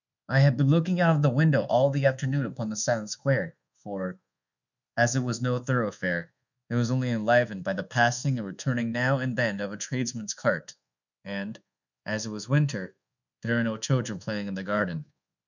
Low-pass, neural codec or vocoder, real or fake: 7.2 kHz; codec, 24 kHz, 1.2 kbps, DualCodec; fake